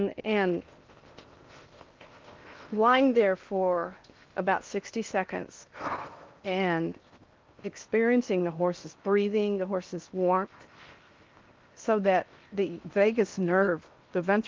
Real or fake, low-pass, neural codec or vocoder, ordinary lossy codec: fake; 7.2 kHz; codec, 16 kHz in and 24 kHz out, 0.8 kbps, FocalCodec, streaming, 65536 codes; Opus, 32 kbps